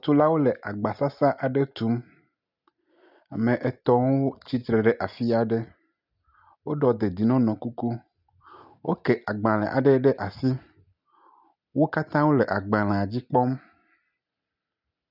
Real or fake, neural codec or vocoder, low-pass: real; none; 5.4 kHz